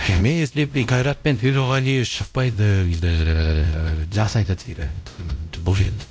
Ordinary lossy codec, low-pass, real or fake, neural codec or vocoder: none; none; fake; codec, 16 kHz, 0.5 kbps, X-Codec, WavLM features, trained on Multilingual LibriSpeech